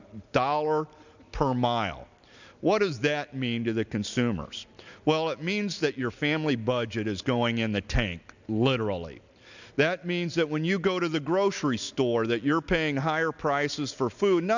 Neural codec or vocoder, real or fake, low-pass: none; real; 7.2 kHz